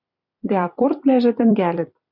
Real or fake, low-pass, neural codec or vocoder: fake; 5.4 kHz; vocoder, 44.1 kHz, 128 mel bands every 256 samples, BigVGAN v2